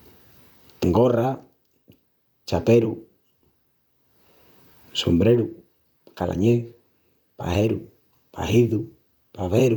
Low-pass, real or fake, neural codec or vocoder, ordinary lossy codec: none; fake; vocoder, 44.1 kHz, 128 mel bands, Pupu-Vocoder; none